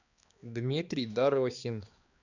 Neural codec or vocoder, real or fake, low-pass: codec, 16 kHz, 2 kbps, X-Codec, HuBERT features, trained on balanced general audio; fake; 7.2 kHz